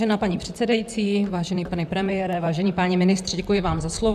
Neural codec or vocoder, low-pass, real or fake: vocoder, 44.1 kHz, 128 mel bands, Pupu-Vocoder; 14.4 kHz; fake